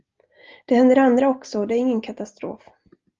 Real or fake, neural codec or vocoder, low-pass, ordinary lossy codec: real; none; 7.2 kHz; Opus, 32 kbps